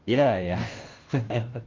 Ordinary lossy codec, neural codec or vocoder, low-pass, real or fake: Opus, 24 kbps; codec, 16 kHz, 0.5 kbps, FunCodec, trained on Chinese and English, 25 frames a second; 7.2 kHz; fake